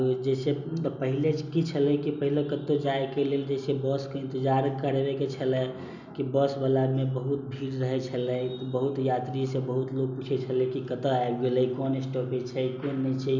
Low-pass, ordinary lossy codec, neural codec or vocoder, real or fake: 7.2 kHz; none; none; real